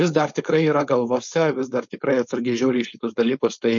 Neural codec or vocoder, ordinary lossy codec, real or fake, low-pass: codec, 16 kHz, 4.8 kbps, FACodec; MP3, 48 kbps; fake; 7.2 kHz